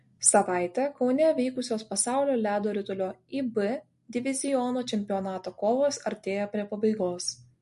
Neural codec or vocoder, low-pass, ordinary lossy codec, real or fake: none; 14.4 kHz; MP3, 48 kbps; real